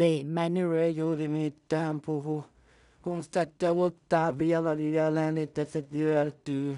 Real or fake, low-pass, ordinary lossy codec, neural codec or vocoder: fake; 10.8 kHz; none; codec, 16 kHz in and 24 kHz out, 0.4 kbps, LongCat-Audio-Codec, two codebook decoder